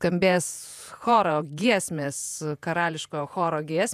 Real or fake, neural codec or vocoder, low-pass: fake; vocoder, 48 kHz, 128 mel bands, Vocos; 14.4 kHz